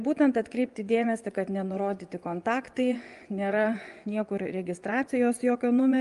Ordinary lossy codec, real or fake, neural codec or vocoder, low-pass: Opus, 32 kbps; fake; vocoder, 24 kHz, 100 mel bands, Vocos; 10.8 kHz